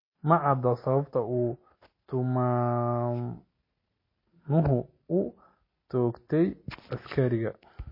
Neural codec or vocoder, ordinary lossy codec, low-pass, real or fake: none; MP3, 24 kbps; 5.4 kHz; real